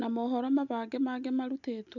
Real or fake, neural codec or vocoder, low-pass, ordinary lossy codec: real; none; 7.2 kHz; none